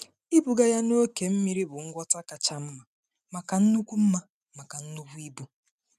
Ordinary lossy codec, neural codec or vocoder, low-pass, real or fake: none; none; 14.4 kHz; real